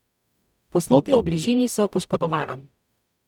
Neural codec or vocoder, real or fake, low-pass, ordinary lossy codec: codec, 44.1 kHz, 0.9 kbps, DAC; fake; 19.8 kHz; none